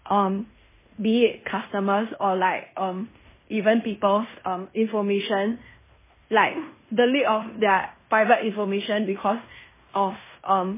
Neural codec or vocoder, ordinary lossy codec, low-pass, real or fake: codec, 16 kHz in and 24 kHz out, 0.9 kbps, LongCat-Audio-Codec, fine tuned four codebook decoder; MP3, 16 kbps; 3.6 kHz; fake